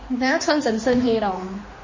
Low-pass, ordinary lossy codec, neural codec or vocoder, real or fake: 7.2 kHz; MP3, 32 kbps; codec, 16 kHz, 2 kbps, X-Codec, HuBERT features, trained on general audio; fake